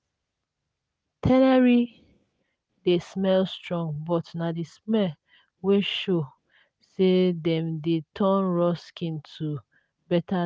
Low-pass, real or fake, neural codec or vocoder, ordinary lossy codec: none; real; none; none